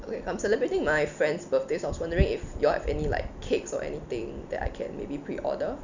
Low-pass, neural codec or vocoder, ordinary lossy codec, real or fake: 7.2 kHz; none; none; real